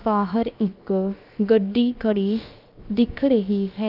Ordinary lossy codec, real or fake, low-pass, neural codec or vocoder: Opus, 32 kbps; fake; 5.4 kHz; codec, 16 kHz, about 1 kbps, DyCAST, with the encoder's durations